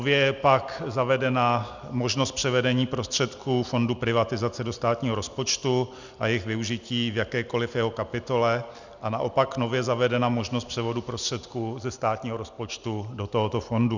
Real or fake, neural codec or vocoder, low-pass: real; none; 7.2 kHz